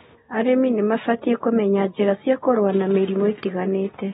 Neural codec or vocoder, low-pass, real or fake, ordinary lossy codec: none; 19.8 kHz; real; AAC, 16 kbps